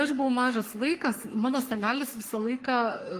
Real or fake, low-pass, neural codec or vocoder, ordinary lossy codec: fake; 14.4 kHz; codec, 44.1 kHz, 3.4 kbps, Pupu-Codec; Opus, 32 kbps